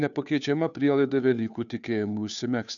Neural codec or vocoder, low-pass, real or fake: codec, 16 kHz, 2 kbps, FunCodec, trained on Chinese and English, 25 frames a second; 7.2 kHz; fake